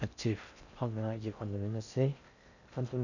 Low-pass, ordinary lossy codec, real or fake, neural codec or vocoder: 7.2 kHz; none; fake; codec, 16 kHz in and 24 kHz out, 0.6 kbps, FocalCodec, streaming, 4096 codes